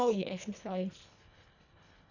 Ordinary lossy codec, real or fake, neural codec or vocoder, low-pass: none; fake; codec, 24 kHz, 1.5 kbps, HILCodec; 7.2 kHz